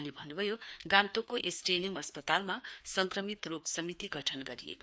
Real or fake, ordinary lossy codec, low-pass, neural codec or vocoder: fake; none; none; codec, 16 kHz, 2 kbps, FreqCodec, larger model